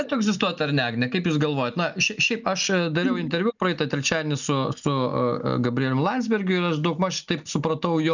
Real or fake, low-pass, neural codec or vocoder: real; 7.2 kHz; none